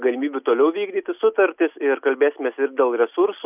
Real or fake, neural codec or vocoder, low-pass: real; none; 3.6 kHz